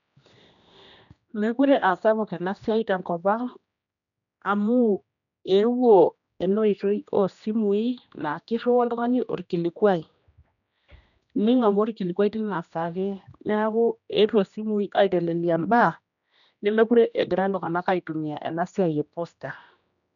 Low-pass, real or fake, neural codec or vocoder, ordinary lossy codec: 7.2 kHz; fake; codec, 16 kHz, 1 kbps, X-Codec, HuBERT features, trained on general audio; none